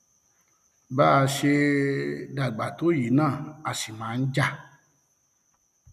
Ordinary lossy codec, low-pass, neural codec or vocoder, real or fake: none; 14.4 kHz; none; real